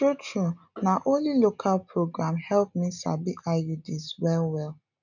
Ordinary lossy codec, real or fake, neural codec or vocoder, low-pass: none; real; none; 7.2 kHz